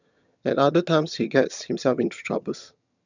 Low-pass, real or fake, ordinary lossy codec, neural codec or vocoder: 7.2 kHz; fake; none; vocoder, 22.05 kHz, 80 mel bands, HiFi-GAN